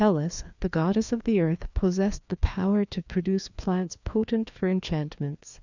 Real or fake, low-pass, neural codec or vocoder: fake; 7.2 kHz; codec, 16 kHz, 2 kbps, FreqCodec, larger model